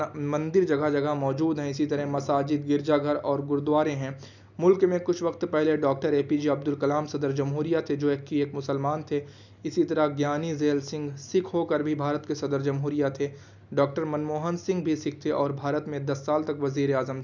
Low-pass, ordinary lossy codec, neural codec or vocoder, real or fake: 7.2 kHz; none; none; real